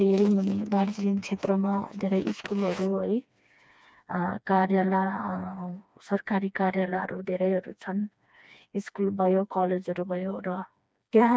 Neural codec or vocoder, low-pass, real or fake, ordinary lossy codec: codec, 16 kHz, 2 kbps, FreqCodec, smaller model; none; fake; none